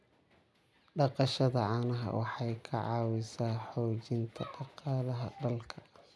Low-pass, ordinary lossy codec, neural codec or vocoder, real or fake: none; none; none; real